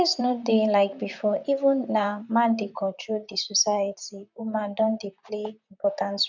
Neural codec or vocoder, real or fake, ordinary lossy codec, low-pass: vocoder, 44.1 kHz, 128 mel bands, Pupu-Vocoder; fake; none; 7.2 kHz